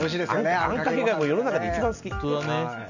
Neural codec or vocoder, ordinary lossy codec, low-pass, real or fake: none; none; 7.2 kHz; real